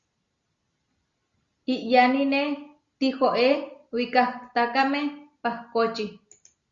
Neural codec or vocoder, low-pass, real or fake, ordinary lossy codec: none; 7.2 kHz; real; Opus, 64 kbps